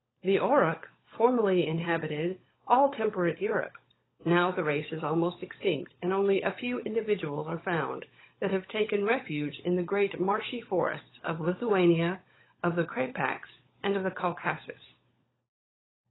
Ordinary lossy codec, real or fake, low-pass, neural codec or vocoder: AAC, 16 kbps; fake; 7.2 kHz; codec, 16 kHz, 16 kbps, FunCodec, trained on LibriTTS, 50 frames a second